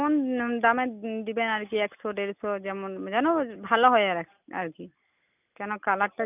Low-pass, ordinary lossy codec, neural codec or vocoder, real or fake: 3.6 kHz; none; none; real